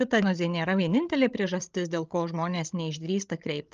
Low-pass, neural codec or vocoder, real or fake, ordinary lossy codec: 7.2 kHz; codec, 16 kHz, 16 kbps, FreqCodec, larger model; fake; Opus, 24 kbps